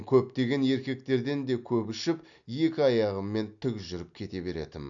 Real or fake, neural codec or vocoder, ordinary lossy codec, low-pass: real; none; none; 7.2 kHz